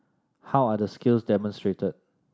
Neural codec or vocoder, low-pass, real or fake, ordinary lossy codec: none; none; real; none